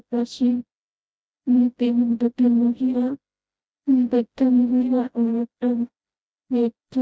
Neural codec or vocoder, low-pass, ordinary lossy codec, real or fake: codec, 16 kHz, 0.5 kbps, FreqCodec, smaller model; none; none; fake